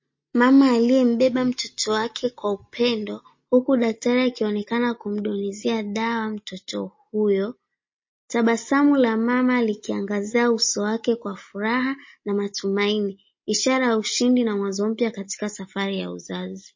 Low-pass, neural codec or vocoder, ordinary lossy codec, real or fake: 7.2 kHz; none; MP3, 32 kbps; real